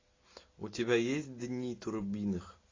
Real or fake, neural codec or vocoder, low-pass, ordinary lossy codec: real; none; 7.2 kHz; AAC, 32 kbps